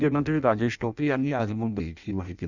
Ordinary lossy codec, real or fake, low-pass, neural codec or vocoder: none; fake; 7.2 kHz; codec, 16 kHz in and 24 kHz out, 0.6 kbps, FireRedTTS-2 codec